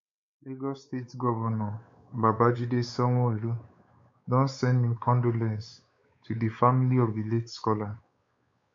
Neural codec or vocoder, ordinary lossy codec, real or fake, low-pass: codec, 16 kHz, 4 kbps, X-Codec, WavLM features, trained on Multilingual LibriSpeech; MP3, 48 kbps; fake; 7.2 kHz